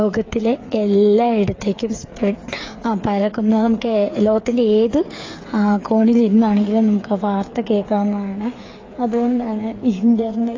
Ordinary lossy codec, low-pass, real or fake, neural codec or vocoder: AAC, 32 kbps; 7.2 kHz; fake; codec, 16 kHz, 8 kbps, FreqCodec, smaller model